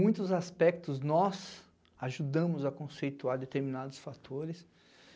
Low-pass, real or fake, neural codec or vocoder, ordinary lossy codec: none; real; none; none